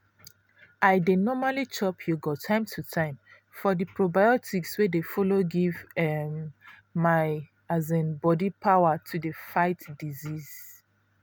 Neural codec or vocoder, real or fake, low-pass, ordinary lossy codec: none; real; none; none